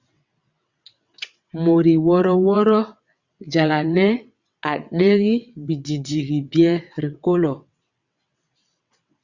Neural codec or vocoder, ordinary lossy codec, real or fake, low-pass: vocoder, 22.05 kHz, 80 mel bands, WaveNeXt; Opus, 64 kbps; fake; 7.2 kHz